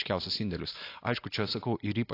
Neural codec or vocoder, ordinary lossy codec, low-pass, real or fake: none; AAC, 32 kbps; 5.4 kHz; real